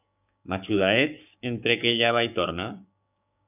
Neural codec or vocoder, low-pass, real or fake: codec, 16 kHz, 6 kbps, DAC; 3.6 kHz; fake